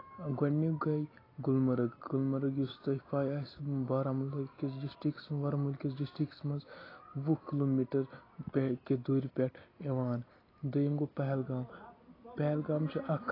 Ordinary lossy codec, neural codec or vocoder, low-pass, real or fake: AAC, 24 kbps; none; 5.4 kHz; real